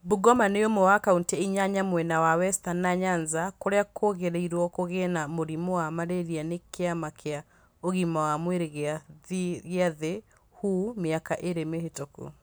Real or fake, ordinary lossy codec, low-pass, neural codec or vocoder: real; none; none; none